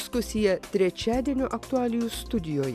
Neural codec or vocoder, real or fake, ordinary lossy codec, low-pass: none; real; AAC, 96 kbps; 14.4 kHz